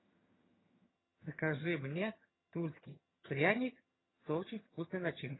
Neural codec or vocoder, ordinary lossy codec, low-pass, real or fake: vocoder, 22.05 kHz, 80 mel bands, HiFi-GAN; AAC, 16 kbps; 7.2 kHz; fake